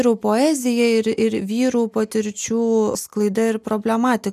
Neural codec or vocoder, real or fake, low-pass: none; real; 14.4 kHz